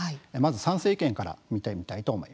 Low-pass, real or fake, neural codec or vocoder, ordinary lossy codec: none; real; none; none